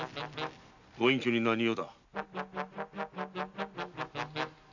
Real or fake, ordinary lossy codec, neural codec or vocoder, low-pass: real; none; none; 7.2 kHz